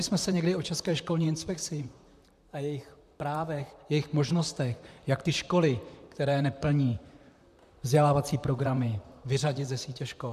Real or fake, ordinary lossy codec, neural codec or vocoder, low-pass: fake; MP3, 96 kbps; vocoder, 44.1 kHz, 128 mel bands, Pupu-Vocoder; 14.4 kHz